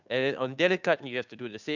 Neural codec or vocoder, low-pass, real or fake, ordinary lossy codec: codec, 16 kHz, 2 kbps, FunCodec, trained on Chinese and English, 25 frames a second; 7.2 kHz; fake; none